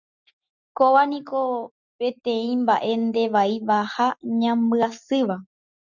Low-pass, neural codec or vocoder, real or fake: 7.2 kHz; none; real